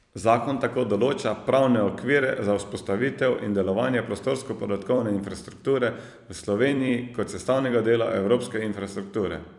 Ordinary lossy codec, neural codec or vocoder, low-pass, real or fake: none; vocoder, 44.1 kHz, 128 mel bands every 256 samples, BigVGAN v2; 10.8 kHz; fake